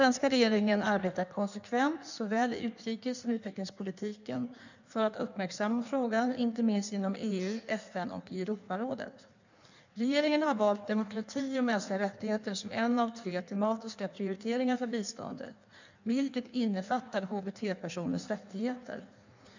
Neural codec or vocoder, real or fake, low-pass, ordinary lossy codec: codec, 16 kHz in and 24 kHz out, 1.1 kbps, FireRedTTS-2 codec; fake; 7.2 kHz; none